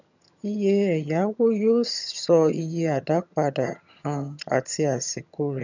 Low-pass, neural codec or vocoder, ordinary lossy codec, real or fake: 7.2 kHz; vocoder, 22.05 kHz, 80 mel bands, HiFi-GAN; none; fake